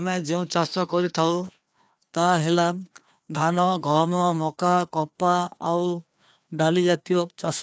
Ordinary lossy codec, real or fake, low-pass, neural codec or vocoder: none; fake; none; codec, 16 kHz, 2 kbps, FreqCodec, larger model